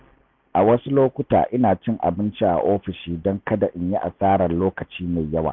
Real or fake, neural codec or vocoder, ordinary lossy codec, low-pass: real; none; none; 7.2 kHz